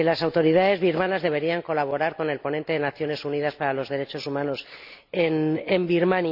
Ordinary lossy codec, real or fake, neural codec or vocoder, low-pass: AAC, 48 kbps; real; none; 5.4 kHz